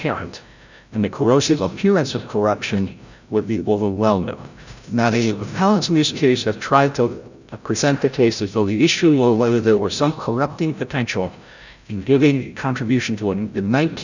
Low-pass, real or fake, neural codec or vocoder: 7.2 kHz; fake; codec, 16 kHz, 0.5 kbps, FreqCodec, larger model